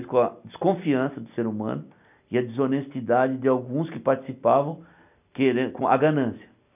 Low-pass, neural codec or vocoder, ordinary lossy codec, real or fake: 3.6 kHz; none; none; real